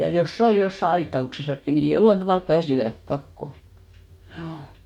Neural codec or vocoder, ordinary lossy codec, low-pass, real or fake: codec, 44.1 kHz, 2.6 kbps, DAC; MP3, 96 kbps; 19.8 kHz; fake